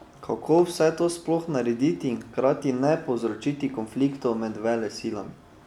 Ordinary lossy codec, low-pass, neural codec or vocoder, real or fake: none; 19.8 kHz; none; real